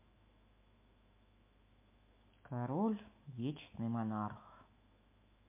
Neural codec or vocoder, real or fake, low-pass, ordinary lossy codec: autoencoder, 48 kHz, 128 numbers a frame, DAC-VAE, trained on Japanese speech; fake; 3.6 kHz; MP3, 24 kbps